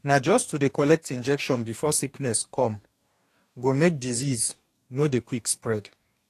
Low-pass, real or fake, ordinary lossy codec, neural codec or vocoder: 14.4 kHz; fake; AAC, 64 kbps; codec, 44.1 kHz, 2.6 kbps, DAC